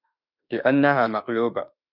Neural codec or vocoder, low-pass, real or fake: autoencoder, 48 kHz, 32 numbers a frame, DAC-VAE, trained on Japanese speech; 5.4 kHz; fake